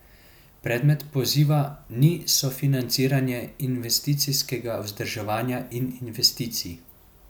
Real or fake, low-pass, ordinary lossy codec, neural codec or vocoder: real; none; none; none